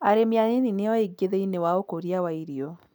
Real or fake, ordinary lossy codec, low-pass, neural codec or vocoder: real; none; 19.8 kHz; none